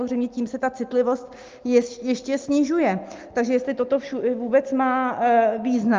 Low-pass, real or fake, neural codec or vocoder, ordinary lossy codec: 7.2 kHz; real; none; Opus, 32 kbps